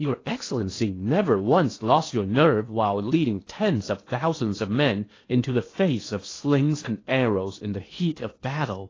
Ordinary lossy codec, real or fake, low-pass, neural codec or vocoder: AAC, 32 kbps; fake; 7.2 kHz; codec, 16 kHz in and 24 kHz out, 0.8 kbps, FocalCodec, streaming, 65536 codes